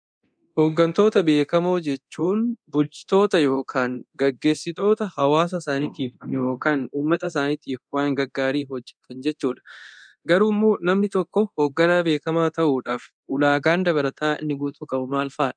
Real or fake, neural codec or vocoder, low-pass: fake; codec, 24 kHz, 0.9 kbps, DualCodec; 9.9 kHz